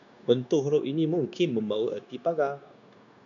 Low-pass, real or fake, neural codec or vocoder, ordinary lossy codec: 7.2 kHz; fake; codec, 16 kHz, 0.9 kbps, LongCat-Audio-Codec; MP3, 96 kbps